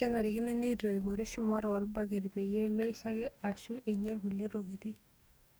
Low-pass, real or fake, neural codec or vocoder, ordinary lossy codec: none; fake; codec, 44.1 kHz, 2.6 kbps, DAC; none